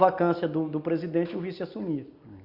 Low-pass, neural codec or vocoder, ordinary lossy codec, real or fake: 5.4 kHz; none; Opus, 64 kbps; real